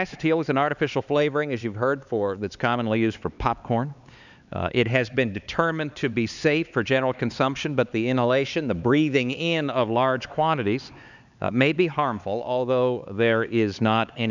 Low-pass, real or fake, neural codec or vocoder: 7.2 kHz; fake; codec, 16 kHz, 4 kbps, X-Codec, HuBERT features, trained on LibriSpeech